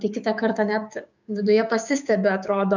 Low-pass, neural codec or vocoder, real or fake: 7.2 kHz; codec, 16 kHz, 6 kbps, DAC; fake